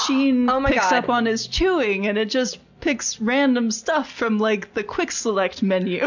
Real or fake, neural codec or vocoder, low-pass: real; none; 7.2 kHz